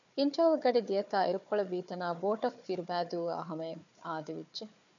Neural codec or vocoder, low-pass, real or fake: codec, 16 kHz, 4 kbps, FunCodec, trained on Chinese and English, 50 frames a second; 7.2 kHz; fake